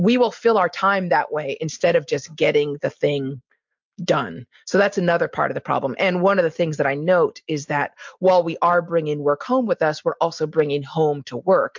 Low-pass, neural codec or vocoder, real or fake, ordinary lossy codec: 7.2 kHz; none; real; MP3, 64 kbps